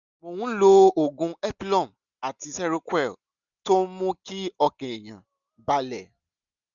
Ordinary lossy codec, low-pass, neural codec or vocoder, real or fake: none; 7.2 kHz; none; real